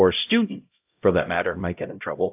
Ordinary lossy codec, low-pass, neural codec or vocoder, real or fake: AAC, 32 kbps; 3.6 kHz; codec, 16 kHz, 0.5 kbps, X-Codec, HuBERT features, trained on LibriSpeech; fake